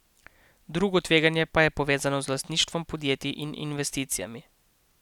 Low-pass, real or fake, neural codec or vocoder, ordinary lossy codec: 19.8 kHz; real; none; none